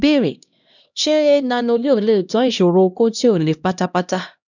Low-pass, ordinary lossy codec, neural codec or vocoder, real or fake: 7.2 kHz; none; codec, 16 kHz, 1 kbps, X-Codec, HuBERT features, trained on LibriSpeech; fake